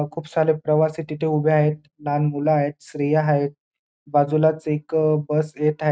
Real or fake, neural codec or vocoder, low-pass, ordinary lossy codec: real; none; none; none